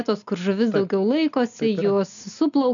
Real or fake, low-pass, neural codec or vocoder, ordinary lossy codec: real; 7.2 kHz; none; MP3, 96 kbps